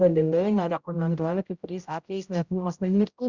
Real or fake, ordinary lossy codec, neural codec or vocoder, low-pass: fake; Opus, 64 kbps; codec, 16 kHz, 0.5 kbps, X-Codec, HuBERT features, trained on general audio; 7.2 kHz